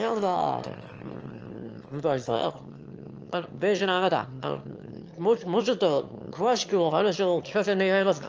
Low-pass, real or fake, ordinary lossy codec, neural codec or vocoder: 7.2 kHz; fake; Opus, 24 kbps; autoencoder, 22.05 kHz, a latent of 192 numbers a frame, VITS, trained on one speaker